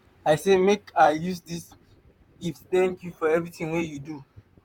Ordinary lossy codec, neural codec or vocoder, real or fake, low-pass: none; vocoder, 44.1 kHz, 128 mel bands, Pupu-Vocoder; fake; 19.8 kHz